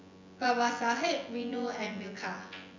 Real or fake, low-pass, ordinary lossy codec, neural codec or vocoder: fake; 7.2 kHz; MP3, 64 kbps; vocoder, 24 kHz, 100 mel bands, Vocos